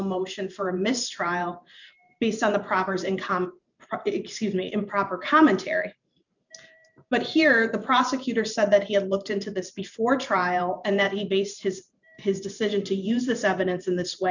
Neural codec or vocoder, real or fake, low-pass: vocoder, 44.1 kHz, 128 mel bands every 512 samples, BigVGAN v2; fake; 7.2 kHz